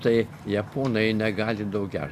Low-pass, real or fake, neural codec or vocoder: 14.4 kHz; real; none